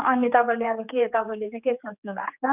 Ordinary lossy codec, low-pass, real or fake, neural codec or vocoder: none; 3.6 kHz; fake; codec, 16 kHz, 4 kbps, X-Codec, HuBERT features, trained on general audio